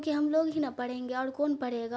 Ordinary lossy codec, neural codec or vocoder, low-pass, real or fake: none; none; none; real